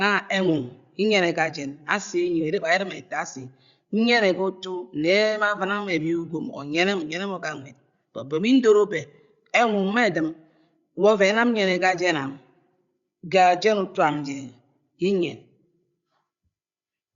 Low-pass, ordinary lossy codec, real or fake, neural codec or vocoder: 7.2 kHz; Opus, 64 kbps; fake; codec, 16 kHz, 16 kbps, FreqCodec, larger model